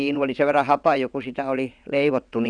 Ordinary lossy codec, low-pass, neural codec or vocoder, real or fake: none; 9.9 kHz; vocoder, 22.05 kHz, 80 mel bands, WaveNeXt; fake